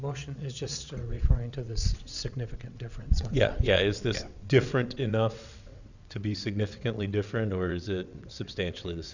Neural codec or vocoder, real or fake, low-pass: vocoder, 22.05 kHz, 80 mel bands, WaveNeXt; fake; 7.2 kHz